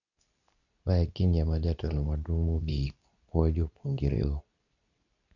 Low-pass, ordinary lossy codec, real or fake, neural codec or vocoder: 7.2 kHz; none; fake; codec, 24 kHz, 0.9 kbps, WavTokenizer, medium speech release version 2